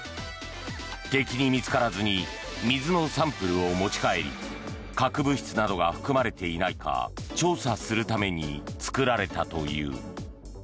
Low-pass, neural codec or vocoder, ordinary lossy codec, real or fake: none; none; none; real